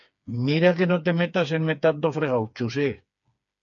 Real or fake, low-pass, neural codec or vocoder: fake; 7.2 kHz; codec, 16 kHz, 4 kbps, FreqCodec, smaller model